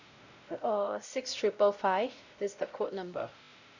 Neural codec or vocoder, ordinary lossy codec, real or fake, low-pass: codec, 16 kHz, 0.5 kbps, X-Codec, WavLM features, trained on Multilingual LibriSpeech; none; fake; 7.2 kHz